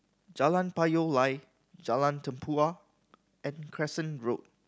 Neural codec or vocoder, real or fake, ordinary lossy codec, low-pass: none; real; none; none